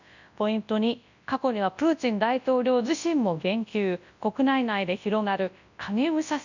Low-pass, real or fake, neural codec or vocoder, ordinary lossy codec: 7.2 kHz; fake; codec, 24 kHz, 0.9 kbps, WavTokenizer, large speech release; none